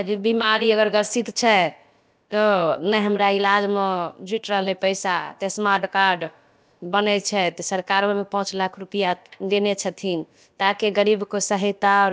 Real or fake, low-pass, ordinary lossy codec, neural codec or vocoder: fake; none; none; codec, 16 kHz, about 1 kbps, DyCAST, with the encoder's durations